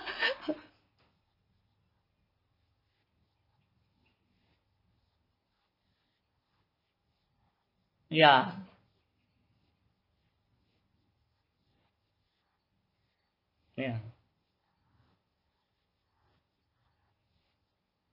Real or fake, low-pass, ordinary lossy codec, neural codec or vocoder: real; 5.4 kHz; MP3, 32 kbps; none